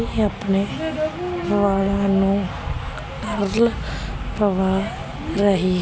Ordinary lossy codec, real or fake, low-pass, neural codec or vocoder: none; real; none; none